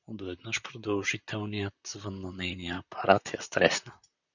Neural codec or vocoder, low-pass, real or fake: vocoder, 44.1 kHz, 128 mel bands, Pupu-Vocoder; 7.2 kHz; fake